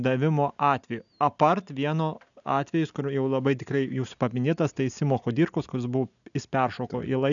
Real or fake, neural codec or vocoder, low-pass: real; none; 7.2 kHz